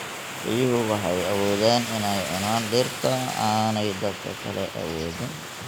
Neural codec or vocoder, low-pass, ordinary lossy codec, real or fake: none; none; none; real